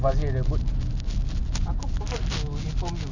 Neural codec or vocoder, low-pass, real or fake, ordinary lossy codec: none; 7.2 kHz; real; none